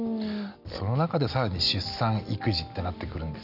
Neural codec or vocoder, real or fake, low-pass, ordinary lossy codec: none; real; 5.4 kHz; none